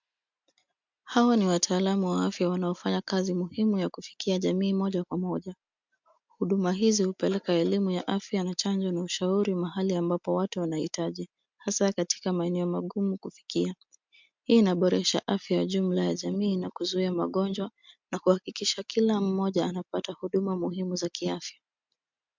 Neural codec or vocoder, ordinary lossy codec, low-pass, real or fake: none; MP3, 64 kbps; 7.2 kHz; real